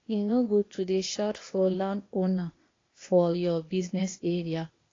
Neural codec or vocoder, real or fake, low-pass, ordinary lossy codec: codec, 16 kHz, 0.8 kbps, ZipCodec; fake; 7.2 kHz; AAC, 32 kbps